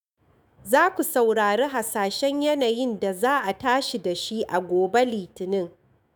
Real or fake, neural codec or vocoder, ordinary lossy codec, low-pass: fake; autoencoder, 48 kHz, 128 numbers a frame, DAC-VAE, trained on Japanese speech; none; none